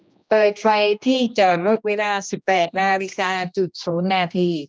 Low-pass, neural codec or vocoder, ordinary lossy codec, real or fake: none; codec, 16 kHz, 1 kbps, X-Codec, HuBERT features, trained on general audio; none; fake